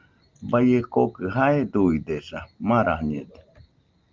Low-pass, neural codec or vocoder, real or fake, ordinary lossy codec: 7.2 kHz; none; real; Opus, 32 kbps